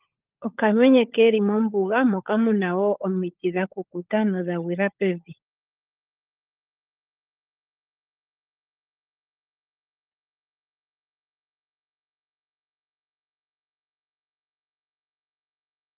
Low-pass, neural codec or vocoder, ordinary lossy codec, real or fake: 3.6 kHz; codec, 16 kHz, 16 kbps, FunCodec, trained on LibriTTS, 50 frames a second; Opus, 32 kbps; fake